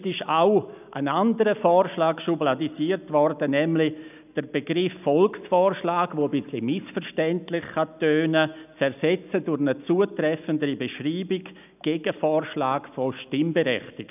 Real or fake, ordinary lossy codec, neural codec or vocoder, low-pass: real; none; none; 3.6 kHz